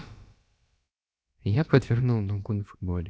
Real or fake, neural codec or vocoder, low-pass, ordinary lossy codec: fake; codec, 16 kHz, about 1 kbps, DyCAST, with the encoder's durations; none; none